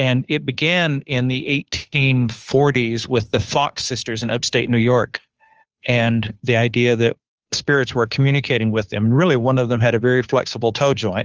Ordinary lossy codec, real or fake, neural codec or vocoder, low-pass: Opus, 24 kbps; fake; codec, 16 kHz, 2 kbps, FunCodec, trained on Chinese and English, 25 frames a second; 7.2 kHz